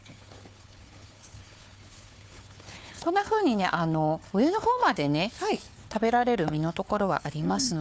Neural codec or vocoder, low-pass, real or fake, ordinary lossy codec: codec, 16 kHz, 4 kbps, FunCodec, trained on Chinese and English, 50 frames a second; none; fake; none